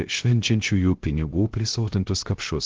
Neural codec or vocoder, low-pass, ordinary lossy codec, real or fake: codec, 16 kHz, 0.3 kbps, FocalCodec; 7.2 kHz; Opus, 16 kbps; fake